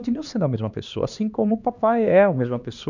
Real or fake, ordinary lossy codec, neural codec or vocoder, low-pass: fake; none; codec, 16 kHz, 2 kbps, X-Codec, HuBERT features, trained on LibriSpeech; 7.2 kHz